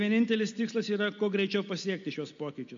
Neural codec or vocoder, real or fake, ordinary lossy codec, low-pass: none; real; MP3, 48 kbps; 7.2 kHz